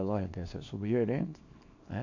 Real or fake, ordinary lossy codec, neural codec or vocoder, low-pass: fake; AAC, 48 kbps; codec, 24 kHz, 0.9 kbps, WavTokenizer, small release; 7.2 kHz